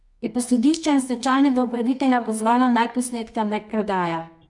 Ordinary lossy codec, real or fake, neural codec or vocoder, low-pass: none; fake; codec, 24 kHz, 0.9 kbps, WavTokenizer, medium music audio release; 10.8 kHz